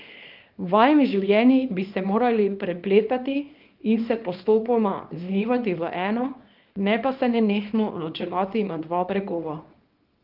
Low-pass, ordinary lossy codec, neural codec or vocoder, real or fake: 5.4 kHz; Opus, 24 kbps; codec, 24 kHz, 0.9 kbps, WavTokenizer, small release; fake